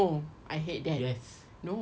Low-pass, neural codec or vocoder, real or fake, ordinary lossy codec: none; none; real; none